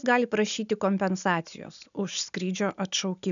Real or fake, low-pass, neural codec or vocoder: real; 7.2 kHz; none